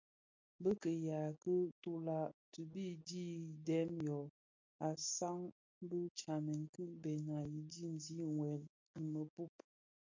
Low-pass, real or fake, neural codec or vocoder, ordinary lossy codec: 7.2 kHz; real; none; MP3, 48 kbps